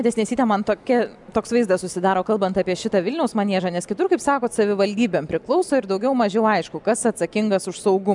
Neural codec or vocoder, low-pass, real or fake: none; 10.8 kHz; real